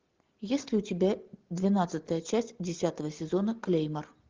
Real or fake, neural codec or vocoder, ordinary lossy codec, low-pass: real; none; Opus, 32 kbps; 7.2 kHz